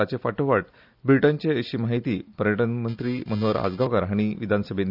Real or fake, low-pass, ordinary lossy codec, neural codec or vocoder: real; 5.4 kHz; none; none